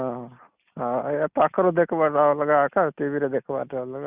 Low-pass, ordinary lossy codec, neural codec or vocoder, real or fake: 3.6 kHz; Opus, 64 kbps; none; real